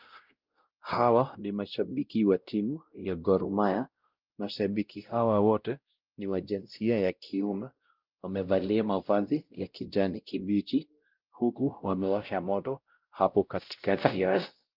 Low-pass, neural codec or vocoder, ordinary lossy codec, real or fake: 5.4 kHz; codec, 16 kHz, 0.5 kbps, X-Codec, WavLM features, trained on Multilingual LibriSpeech; Opus, 24 kbps; fake